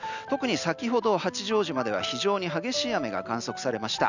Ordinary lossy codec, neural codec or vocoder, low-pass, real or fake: none; none; 7.2 kHz; real